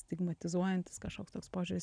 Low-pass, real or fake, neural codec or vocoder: 9.9 kHz; real; none